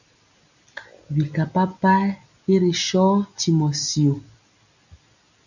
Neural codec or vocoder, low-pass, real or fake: none; 7.2 kHz; real